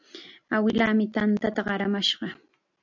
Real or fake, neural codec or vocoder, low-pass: real; none; 7.2 kHz